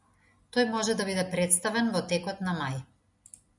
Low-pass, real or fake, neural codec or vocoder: 10.8 kHz; real; none